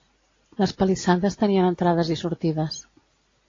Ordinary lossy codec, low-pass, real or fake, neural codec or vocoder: AAC, 32 kbps; 7.2 kHz; real; none